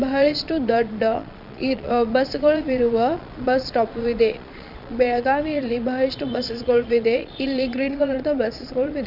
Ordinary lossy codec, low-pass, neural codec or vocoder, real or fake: none; 5.4 kHz; vocoder, 22.05 kHz, 80 mel bands, Vocos; fake